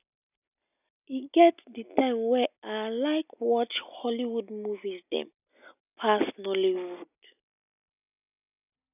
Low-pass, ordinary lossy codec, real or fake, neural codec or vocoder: 3.6 kHz; none; real; none